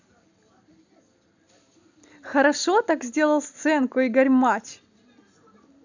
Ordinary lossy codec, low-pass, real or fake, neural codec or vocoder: none; 7.2 kHz; real; none